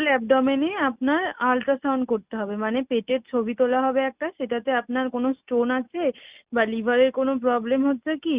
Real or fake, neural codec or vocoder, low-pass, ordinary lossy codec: real; none; 3.6 kHz; Opus, 64 kbps